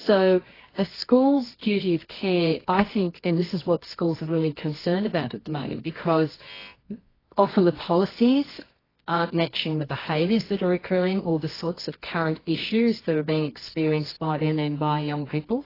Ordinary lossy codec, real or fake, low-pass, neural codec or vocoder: AAC, 24 kbps; fake; 5.4 kHz; codec, 24 kHz, 0.9 kbps, WavTokenizer, medium music audio release